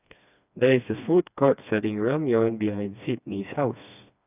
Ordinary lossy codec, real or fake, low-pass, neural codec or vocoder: none; fake; 3.6 kHz; codec, 16 kHz, 2 kbps, FreqCodec, smaller model